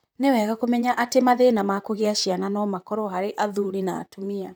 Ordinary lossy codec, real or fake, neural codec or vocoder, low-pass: none; fake; vocoder, 44.1 kHz, 128 mel bands, Pupu-Vocoder; none